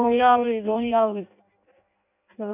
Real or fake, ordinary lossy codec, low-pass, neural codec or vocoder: fake; none; 3.6 kHz; codec, 16 kHz in and 24 kHz out, 0.6 kbps, FireRedTTS-2 codec